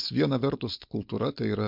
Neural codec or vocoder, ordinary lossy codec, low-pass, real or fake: codec, 16 kHz, 4.8 kbps, FACodec; MP3, 48 kbps; 5.4 kHz; fake